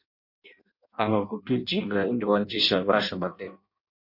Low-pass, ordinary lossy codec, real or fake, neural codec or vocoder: 5.4 kHz; MP3, 32 kbps; fake; codec, 16 kHz in and 24 kHz out, 0.6 kbps, FireRedTTS-2 codec